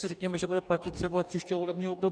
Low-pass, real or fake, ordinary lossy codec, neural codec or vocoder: 9.9 kHz; fake; MP3, 96 kbps; codec, 44.1 kHz, 2.6 kbps, DAC